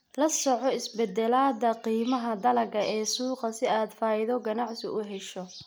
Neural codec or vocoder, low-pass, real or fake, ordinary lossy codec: none; none; real; none